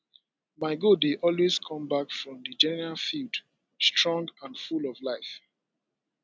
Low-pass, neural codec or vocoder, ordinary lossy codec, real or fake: none; none; none; real